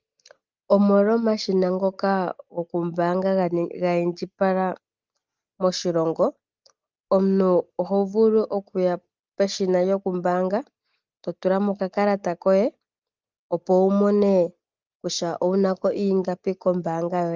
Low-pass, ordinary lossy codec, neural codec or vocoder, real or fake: 7.2 kHz; Opus, 24 kbps; none; real